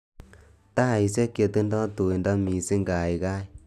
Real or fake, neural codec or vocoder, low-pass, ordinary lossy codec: real; none; 14.4 kHz; none